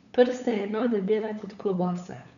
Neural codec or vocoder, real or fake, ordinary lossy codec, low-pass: codec, 16 kHz, 8 kbps, FunCodec, trained on LibriTTS, 25 frames a second; fake; none; 7.2 kHz